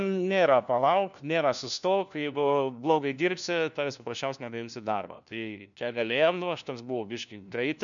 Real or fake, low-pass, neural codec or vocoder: fake; 7.2 kHz; codec, 16 kHz, 1 kbps, FunCodec, trained on LibriTTS, 50 frames a second